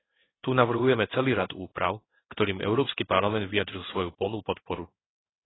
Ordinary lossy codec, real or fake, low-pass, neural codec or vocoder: AAC, 16 kbps; fake; 7.2 kHz; codec, 16 kHz in and 24 kHz out, 1 kbps, XY-Tokenizer